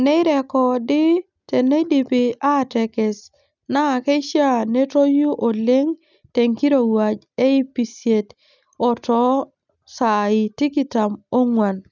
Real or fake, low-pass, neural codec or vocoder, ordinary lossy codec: real; 7.2 kHz; none; none